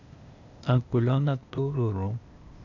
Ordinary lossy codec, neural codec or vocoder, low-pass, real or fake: none; codec, 16 kHz, 0.8 kbps, ZipCodec; 7.2 kHz; fake